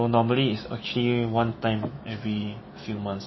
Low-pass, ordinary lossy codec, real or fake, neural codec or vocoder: 7.2 kHz; MP3, 24 kbps; fake; autoencoder, 48 kHz, 128 numbers a frame, DAC-VAE, trained on Japanese speech